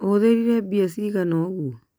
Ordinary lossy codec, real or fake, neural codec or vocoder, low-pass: none; real; none; none